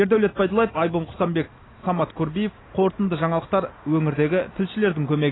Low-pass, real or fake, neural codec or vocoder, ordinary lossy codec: 7.2 kHz; real; none; AAC, 16 kbps